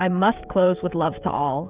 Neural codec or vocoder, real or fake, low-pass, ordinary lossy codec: codec, 16 kHz, 16 kbps, FreqCodec, smaller model; fake; 3.6 kHz; Opus, 64 kbps